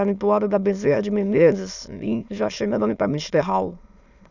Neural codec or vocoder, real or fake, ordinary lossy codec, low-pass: autoencoder, 22.05 kHz, a latent of 192 numbers a frame, VITS, trained on many speakers; fake; none; 7.2 kHz